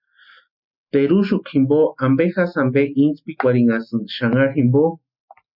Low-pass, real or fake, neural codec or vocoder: 5.4 kHz; real; none